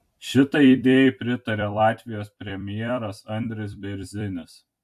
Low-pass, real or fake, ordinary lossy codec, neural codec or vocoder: 14.4 kHz; fake; AAC, 96 kbps; vocoder, 44.1 kHz, 128 mel bands every 256 samples, BigVGAN v2